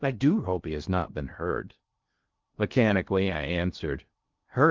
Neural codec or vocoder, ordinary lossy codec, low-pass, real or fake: codec, 16 kHz in and 24 kHz out, 0.6 kbps, FocalCodec, streaming, 2048 codes; Opus, 32 kbps; 7.2 kHz; fake